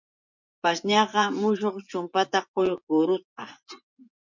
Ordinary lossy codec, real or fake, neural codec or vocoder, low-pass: MP3, 48 kbps; fake; vocoder, 24 kHz, 100 mel bands, Vocos; 7.2 kHz